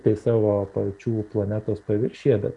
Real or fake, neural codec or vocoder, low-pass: real; none; 10.8 kHz